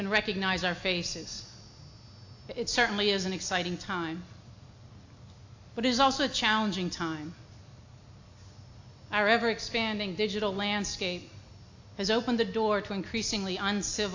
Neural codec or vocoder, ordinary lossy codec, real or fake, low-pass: none; AAC, 48 kbps; real; 7.2 kHz